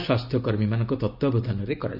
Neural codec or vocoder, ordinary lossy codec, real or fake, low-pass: none; none; real; 5.4 kHz